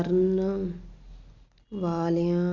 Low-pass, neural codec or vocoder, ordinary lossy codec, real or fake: 7.2 kHz; none; Opus, 64 kbps; real